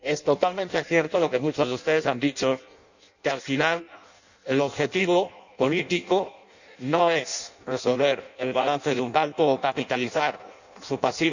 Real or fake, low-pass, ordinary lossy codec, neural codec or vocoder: fake; 7.2 kHz; none; codec, 16 kHz in and 24 kHz out, 0.6 kbps, FireRedTTS-2 codec